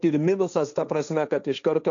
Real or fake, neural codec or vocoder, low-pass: fake; codec, 16 kHz, 1.1 kbps, Voila-Tokenizer; 7.2 kHz